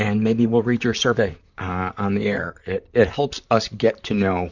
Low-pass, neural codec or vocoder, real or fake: 7.2 kHz; vocoder, 44.1 kHz, 128 mel bands, Pupu-Vocoder; fake